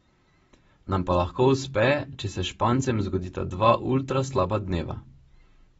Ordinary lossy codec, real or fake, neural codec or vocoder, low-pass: AAC, 24 kbps; real; none; 10.8 kHz